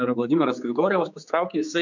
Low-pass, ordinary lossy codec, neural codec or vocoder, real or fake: 7.2 kHz; MP3, 64 kbps; codec, 16 kHz, 4 kbps, X-Codec, HuBERT features, trained on general audio; fake